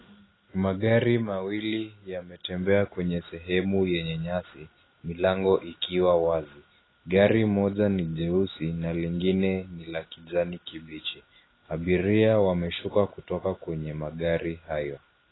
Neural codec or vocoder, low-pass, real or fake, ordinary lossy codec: none; 7.2 kHz; real; AAC, 16 kbps